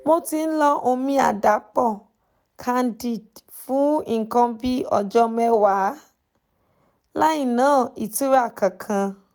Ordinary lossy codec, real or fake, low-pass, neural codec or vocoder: none; real; none; none